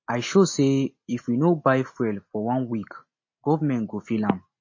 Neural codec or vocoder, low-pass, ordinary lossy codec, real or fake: none; 7.2 kHz; MP3, 32 kbps; real